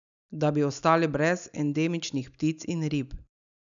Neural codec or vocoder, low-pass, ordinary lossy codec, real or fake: none; 7.2 kHz; none; real